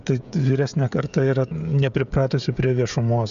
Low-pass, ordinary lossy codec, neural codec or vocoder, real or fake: 7.2 kHz; MP3, 96 kbps; codec, 16 kHz, 16 kbps, FreqCodec, smaller model; fake